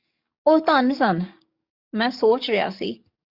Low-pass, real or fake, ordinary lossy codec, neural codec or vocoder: 5.4 kHz; fake; Opus, 64 kbps; codec, 44.1 kHz, 7.8 kbps, DAC